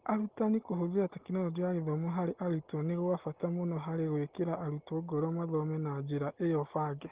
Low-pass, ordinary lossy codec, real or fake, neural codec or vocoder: 3.6 kHz; Opus, 16 kbps; real; none